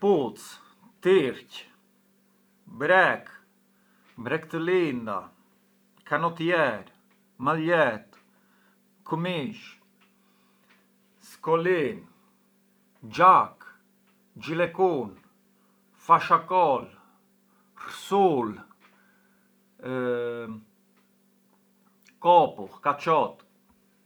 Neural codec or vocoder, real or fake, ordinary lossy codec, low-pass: none; real; none; none